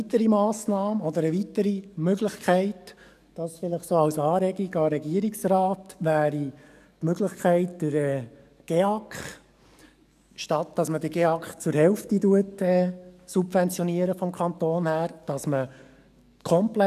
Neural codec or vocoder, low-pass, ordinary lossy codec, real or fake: codec, 44.1 kHz, 7.8 kbps, DAC; 14.4 kHz; none; fake